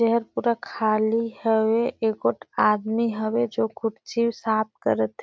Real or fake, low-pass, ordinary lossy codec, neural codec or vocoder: real; none; none; none